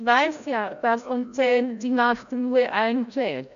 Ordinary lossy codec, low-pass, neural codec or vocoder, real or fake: none; 7.2 kHz; codec, 16 kHz, 0.5 kbps, FreqCodec, larger model; fake